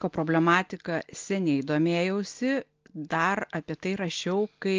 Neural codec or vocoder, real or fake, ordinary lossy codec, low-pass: none; real; Opus, 24 kbps; 7.2 kHz